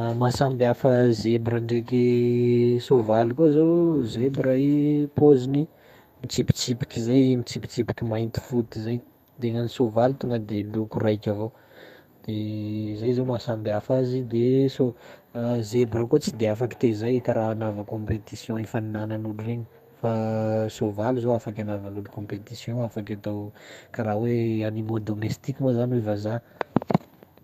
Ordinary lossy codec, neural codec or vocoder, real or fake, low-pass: none; codec, 32 kHz, 1.9 kbps, SNAC; fake; 14.4 kHz